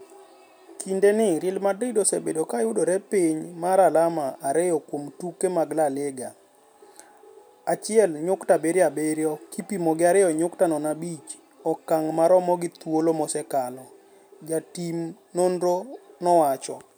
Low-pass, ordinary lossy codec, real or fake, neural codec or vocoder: none; none; real; none